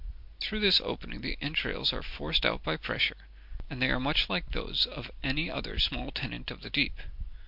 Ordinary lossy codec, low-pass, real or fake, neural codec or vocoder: MP3, 48 kbps; 5.4 kHz; real; none